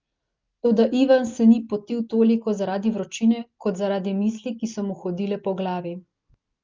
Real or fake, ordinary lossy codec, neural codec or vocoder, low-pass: real; Opus, 32 kbps; none; 7.2 kHz